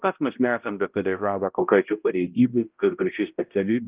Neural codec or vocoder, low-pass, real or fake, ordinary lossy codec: codec, 16 kHz, 0.5 kbps, X-Codec, HuBERT features, trained on balanced general audio; 3.6 kHz; fake; Opus, 24 kbps